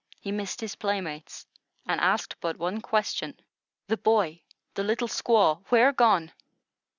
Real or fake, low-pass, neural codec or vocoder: real; 7.2 kHz; none